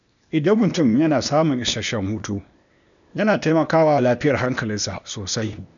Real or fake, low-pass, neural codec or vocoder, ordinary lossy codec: fake; 7.2 kHz; codec, 16 kHz, 0.8 kbps, ZipCodec; none